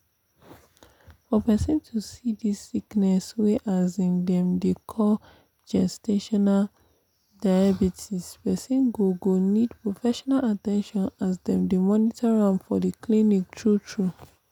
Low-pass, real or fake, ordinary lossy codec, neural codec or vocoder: 19.8 kHz; real; none; none